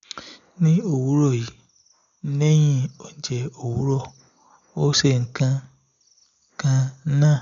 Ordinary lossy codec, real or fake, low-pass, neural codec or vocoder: none; real; 7.2 kHz; none